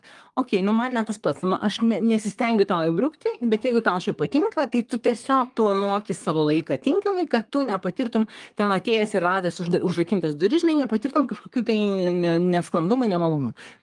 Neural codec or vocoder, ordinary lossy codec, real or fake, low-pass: codec, 24 kHz, 1 kbps, SNAC; Opus, 32 kbps; fake; 10.8 kHz